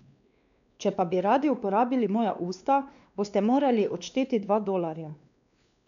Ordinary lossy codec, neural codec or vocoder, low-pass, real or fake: none; codec, 16 kHz, 2 kbps, X-Codec, WavLM features, trained on Multilingual LibriSpeech; 7.2 kHz; fake